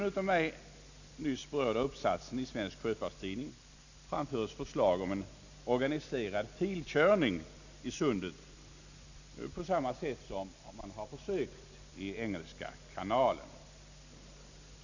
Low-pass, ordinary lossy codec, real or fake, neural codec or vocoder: 7.2 kHz; none; real; none